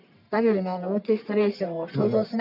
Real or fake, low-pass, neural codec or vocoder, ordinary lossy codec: fake; 5.4 kHz; codec, 44.1 kHz, 1.7 kbps, Pupu-Codec; none